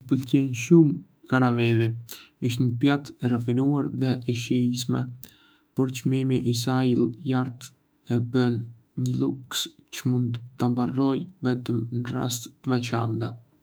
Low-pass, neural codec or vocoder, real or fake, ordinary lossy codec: none; autoencoder, 48 kHz, 32 numbers a frame, DAC-VAE, trained on Japanese speech; fake; none